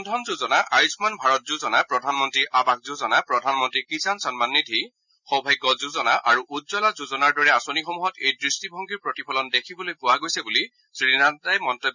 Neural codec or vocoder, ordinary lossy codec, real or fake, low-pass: none; none; real; 7.2 kHz